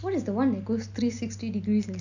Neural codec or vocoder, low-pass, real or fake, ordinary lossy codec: none; 7.2 kHz; real; none